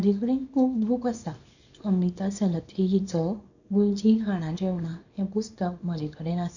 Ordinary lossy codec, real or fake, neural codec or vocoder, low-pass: none; fake; codec, 24 kHz, 0.9 kbps, WavTokenizer, small release; 7.2 kHz